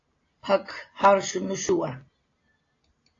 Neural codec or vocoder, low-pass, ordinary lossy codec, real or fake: none; 7.2 kHz; AAC, 32 kbps; real